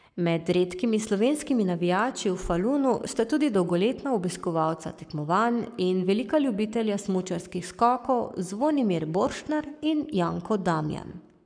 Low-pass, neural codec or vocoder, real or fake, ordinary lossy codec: 9.9 kHz; codec, 44.1 kHz, 7.8 kbps, Pupu-Codec; fake; none